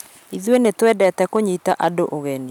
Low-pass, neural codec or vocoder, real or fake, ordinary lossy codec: 19.8 kHz; none; real; none